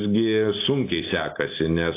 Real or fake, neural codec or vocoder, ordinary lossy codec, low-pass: real; none; AAC, 24 kbps; 3.6 kHz